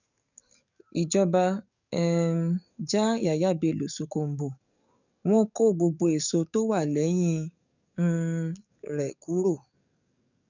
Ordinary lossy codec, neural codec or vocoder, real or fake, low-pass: none; codec, 44.1 kHz, 7.8 kbps, DAC; fake; 7.2 kHz